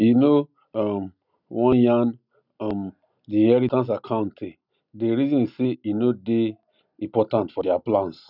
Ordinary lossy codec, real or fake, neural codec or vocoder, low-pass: none; real; none; 5.4 kHz